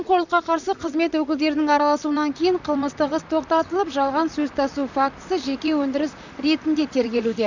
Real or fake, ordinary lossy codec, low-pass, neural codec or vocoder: fake; none; 7.2 kHz; vocoder, 44.1 kHz, 128 mel bands, Pupu-Vocoder